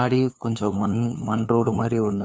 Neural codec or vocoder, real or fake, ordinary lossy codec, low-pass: codec, 16 kHz, 2 kbps, FunCodec, trained on LibriTTS, 25 frames a second; fake; none; none